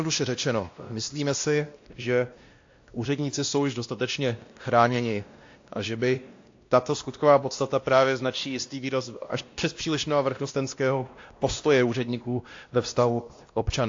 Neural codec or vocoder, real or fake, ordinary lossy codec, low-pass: codec, 16 kHz, 1 kbps, X-Codec, WavLM features, trained on Multilingual LibriSpeech; fake; AAC, 48 kbps; 7.2 kHz